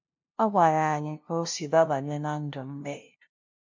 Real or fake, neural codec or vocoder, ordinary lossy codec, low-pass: fake; codec, 16 kHz, 0.5 kbps, FunCodec, trained on LibriTTS, 25 frames a second; MP3, 48 kbps; 7.2 kHz